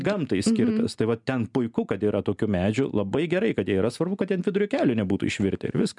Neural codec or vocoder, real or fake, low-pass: none; real; 10.8 kHz